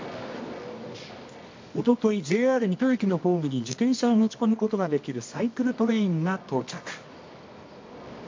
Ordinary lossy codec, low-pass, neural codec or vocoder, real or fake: MP3, 48 kbps; 7.2 kHz; codec, 24 kHz, 0.9 kbps, WavTokenizer, medium music audio release; fake